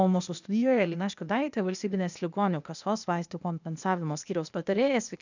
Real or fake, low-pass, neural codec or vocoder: fake; 7.2 kHz; codec, 16 kHz, 0.8 kbps, ZipCodec